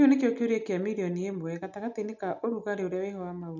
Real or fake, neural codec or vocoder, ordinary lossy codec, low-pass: real; none; none; 7.2 kHz